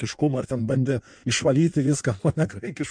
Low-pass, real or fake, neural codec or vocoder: 9.9 kHz; fake; codec, 16 kHz in and 24 kHz out, 1.1 kbps, FireRedTTS-2 codec